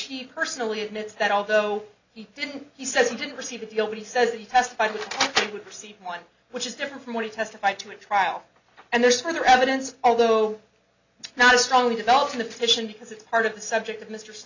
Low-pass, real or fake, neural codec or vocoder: 7.2 kHz; real; none